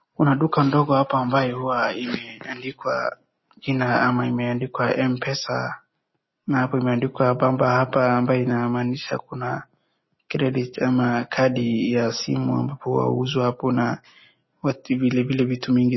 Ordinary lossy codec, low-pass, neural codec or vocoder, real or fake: MP3, 24 kbps; 7.2 kHz; none; real